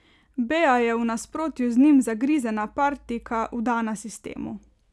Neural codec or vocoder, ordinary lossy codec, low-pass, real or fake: none; none; none; real